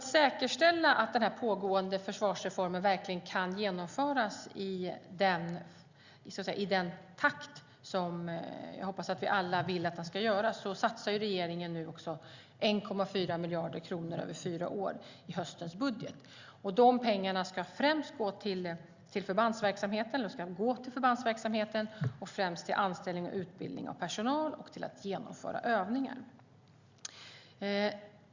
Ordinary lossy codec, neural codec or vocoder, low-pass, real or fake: Opus, 64 kbps; none; 7.2 kHz; real